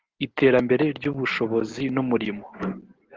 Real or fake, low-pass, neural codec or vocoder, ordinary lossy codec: real; 7.2 kHz; none; Opus, 16 kbps